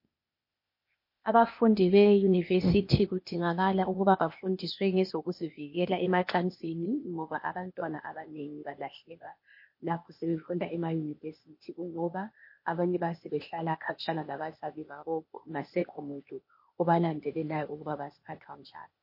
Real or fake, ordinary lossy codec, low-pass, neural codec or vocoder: fake; MP3, 32 kbps; 5.4 kHz; codec, 16 kHz, 0.8 kbps, ZipCodec